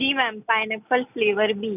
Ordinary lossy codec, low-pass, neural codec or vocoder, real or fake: none; 3.6 kHz; none; real